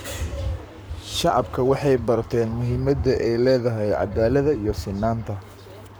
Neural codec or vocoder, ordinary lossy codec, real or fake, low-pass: codec, 44.1 kHz, 7.8 kbps, Pupu-Codec; none; fake; none